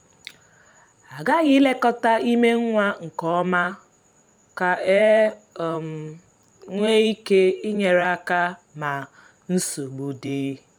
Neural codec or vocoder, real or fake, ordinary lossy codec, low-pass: vocoder, 44.1 kHz, 128 mel bands every 512 samples, BigVGAN v2; fake; none; 19.8 kHz